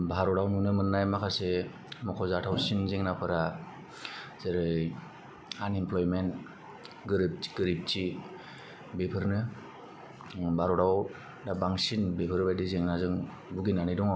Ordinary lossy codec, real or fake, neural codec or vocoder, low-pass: none; real; none; none